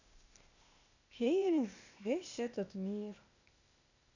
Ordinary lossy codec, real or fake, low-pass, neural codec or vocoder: none; fake; 7.2 kHz; codec, 16 kHz, 0.8 kbps, ZipCodec